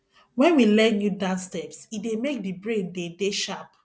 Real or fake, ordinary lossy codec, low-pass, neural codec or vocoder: real; none; none; none